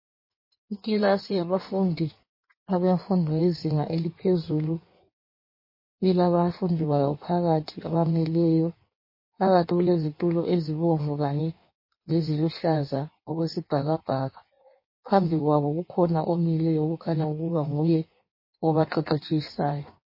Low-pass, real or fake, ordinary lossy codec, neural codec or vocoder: 5.4 kHz; fake; MP3, 24 kbps; codec, 16 kHz in and 24 kHz out, 1.1 kbps, FireRedTTS-2 codec